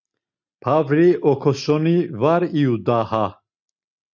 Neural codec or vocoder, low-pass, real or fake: none; 7.2 kHz; real